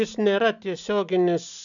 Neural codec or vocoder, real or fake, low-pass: none; real; 7.2 kHz